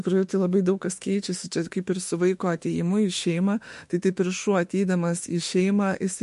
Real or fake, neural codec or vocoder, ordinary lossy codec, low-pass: fake; autoencoder, 48 kHz, 32 numbers a frame, DAC-VAE, trained on Japanese speech; MP3, 48 kbps; 14.4 kHz